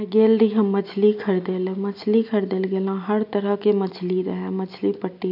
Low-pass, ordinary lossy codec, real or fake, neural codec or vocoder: 5.4 kHz; none; real; none